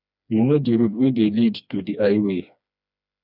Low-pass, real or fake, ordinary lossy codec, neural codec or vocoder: 5.4 kHz; fake; none; codec, 16 kHz, 2 kbps, FreqCodec, smaller model